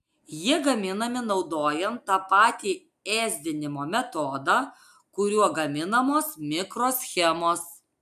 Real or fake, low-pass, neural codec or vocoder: real; 14.4 kHz; none